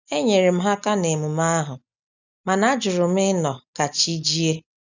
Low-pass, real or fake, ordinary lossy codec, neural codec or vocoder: 7.2 kHz; real; none; none